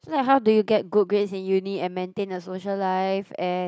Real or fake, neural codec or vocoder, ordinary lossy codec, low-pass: real; none; none; none